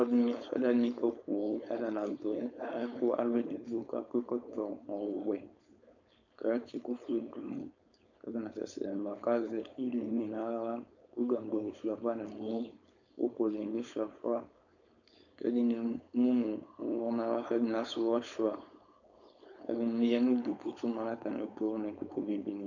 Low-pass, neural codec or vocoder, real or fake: 7.2 kHz; codec, 16 kHz, 4.8 kbps, FACodec; fake